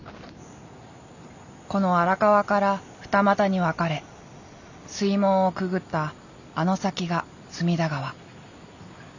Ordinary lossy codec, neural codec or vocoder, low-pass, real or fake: none; none; 7.2 kHz; real